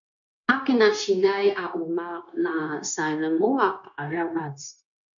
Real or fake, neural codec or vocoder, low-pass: fake; codec, 16 kHz, 0.9 kbps, LongCat-Audio-Codec; 7.2 kHz